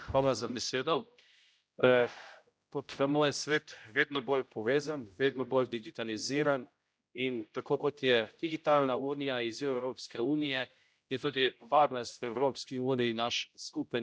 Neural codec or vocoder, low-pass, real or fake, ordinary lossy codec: codec, 16 kHz, 0.5 kbps, X-Codec, HuBERT features, trained on general audio; none; fake; none